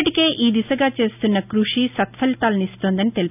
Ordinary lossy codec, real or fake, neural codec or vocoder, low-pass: none; real; none; 3.6 kHz